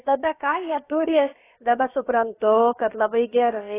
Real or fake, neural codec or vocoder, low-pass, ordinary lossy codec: fake; codec, 16 kHz, about 1 kbps, DyCAST, with the encoder's durations; 3.6 kHz; AAC, 16 kbps